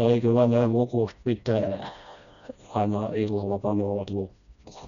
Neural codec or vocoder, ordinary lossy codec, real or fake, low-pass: codec, 16 kHz, 1 kbps, FreqCodec, smaller model; none; fake; 7.2 kHz